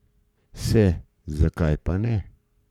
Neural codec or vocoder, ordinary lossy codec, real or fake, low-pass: codec, 44.1 kHz, 7.8 kbps, Pupu-Codec; none; fake; 19.8 kHz